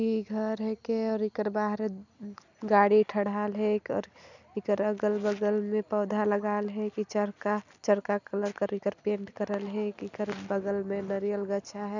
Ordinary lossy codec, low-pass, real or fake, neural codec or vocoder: none; 7.2 kHz; real; none